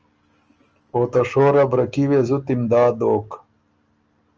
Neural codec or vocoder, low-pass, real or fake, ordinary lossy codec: none; 7.2 kHz; real; Opus, 24 kbps